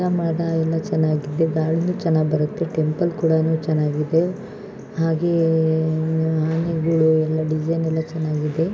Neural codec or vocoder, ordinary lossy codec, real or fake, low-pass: none; none; real; none